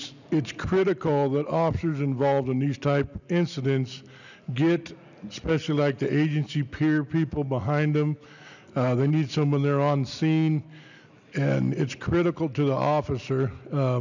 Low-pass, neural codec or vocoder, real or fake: 7.2 kHz; none; real